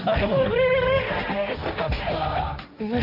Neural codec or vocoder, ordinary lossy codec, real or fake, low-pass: codec, 16 kHz, 1.1 kbps, Voila-Tokenizer; none; fake; 5.4 kHz